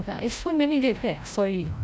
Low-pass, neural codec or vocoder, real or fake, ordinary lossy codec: none; codec, 16 kHz, 0.5 kbps, FreqCodec, larger model; fake; none